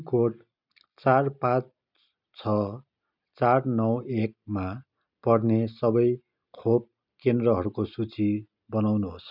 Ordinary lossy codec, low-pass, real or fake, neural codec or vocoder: none; 5.4 kHz; real; none